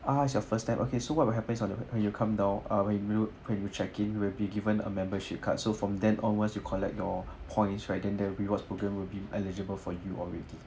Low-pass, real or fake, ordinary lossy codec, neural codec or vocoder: none; real; none; none